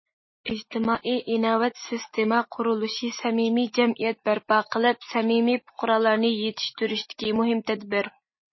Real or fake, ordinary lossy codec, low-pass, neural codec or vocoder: real; MP3, 24 kbps; 7.2 kHz; none